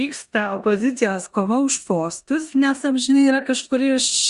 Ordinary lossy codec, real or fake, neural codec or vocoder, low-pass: Opus, 64 kbps; fake; codec, 16 kHz in and 24 kHz out, 0.9 kbps, LongCat-Audio-Codec, four codebook decoder; 10.8 kHz